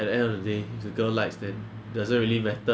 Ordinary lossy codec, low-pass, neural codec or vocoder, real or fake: none; none; none; real